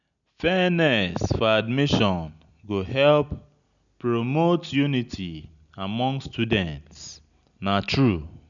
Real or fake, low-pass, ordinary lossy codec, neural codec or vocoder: real; 7.2 kHz; none; none